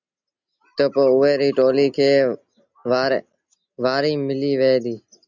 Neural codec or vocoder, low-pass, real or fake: none; 7.2 kHz; real